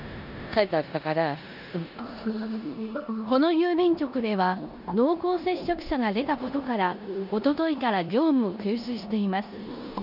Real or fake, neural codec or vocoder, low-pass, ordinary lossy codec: fake; codec, 16 kHz in and 24 kHz out, 0.9 kbps, LongCat-Audio-Codec, four codebook decoder; 5.4 kHz; none